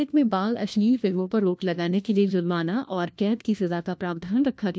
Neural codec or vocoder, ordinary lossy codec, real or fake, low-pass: codec, 16 kHz, 1 kbps, FunCodec, trained on LibriTTS, 50 frames a second; none; fake; none